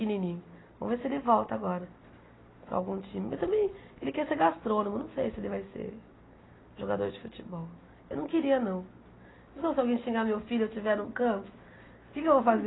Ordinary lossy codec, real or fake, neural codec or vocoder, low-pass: AAC, 16 kbps; real; none; 7.2 kHz